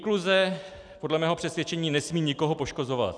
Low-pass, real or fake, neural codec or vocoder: 9.9 kHz; real; none